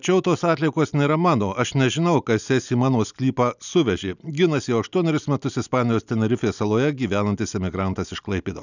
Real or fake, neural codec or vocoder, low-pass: real; none; 7.2 kHz